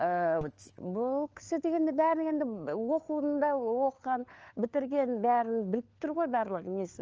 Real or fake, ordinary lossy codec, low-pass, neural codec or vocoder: fake; Opus, 24 kbps; 7.2 kHz; codec, 16 kHz, 4 kbps, FunCodec, trained on LibriTTS, 50 frames a second